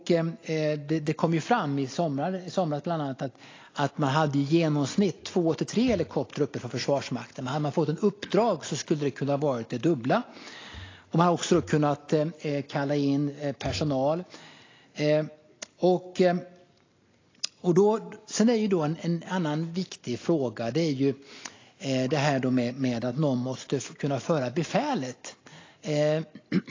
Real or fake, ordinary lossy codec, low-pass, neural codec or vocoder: real; AAC, 32 kbps; 7.2 kHz; none